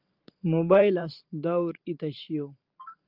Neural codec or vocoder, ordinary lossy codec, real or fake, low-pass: vocoder, 44.1 kHz, 128 mel bands every 512 samples, BigVGAN v2; Opus, 32 kbps; fake; 5.4 kHz